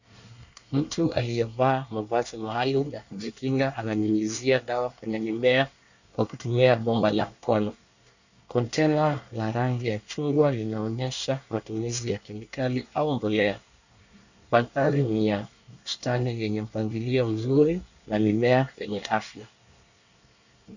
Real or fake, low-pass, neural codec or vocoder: fake; 7.2 kHz; codec, 24 kHz, 1 kbps, SNAC